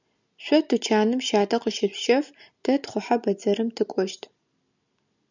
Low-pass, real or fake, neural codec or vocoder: 7.2 kHz; real; none